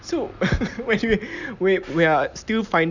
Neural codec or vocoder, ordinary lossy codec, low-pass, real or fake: none; none; 7.2 kHz; real